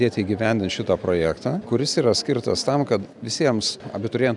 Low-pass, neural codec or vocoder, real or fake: 10.8 kHz; none; real